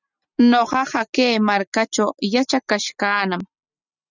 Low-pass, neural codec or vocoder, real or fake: 7.2 kHz; none; real